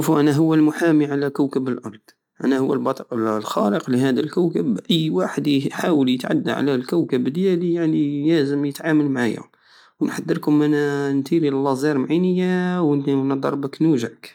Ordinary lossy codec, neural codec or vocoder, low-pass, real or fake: none; none; 19.8 kHz; real